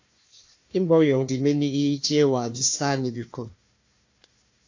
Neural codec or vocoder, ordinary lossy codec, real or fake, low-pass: codec, 16 kHz, 1 kbps, FunCodec, trained on Chinese and English, 50 frames a second; AAC, 48 kbps; fake; 7.2 kHz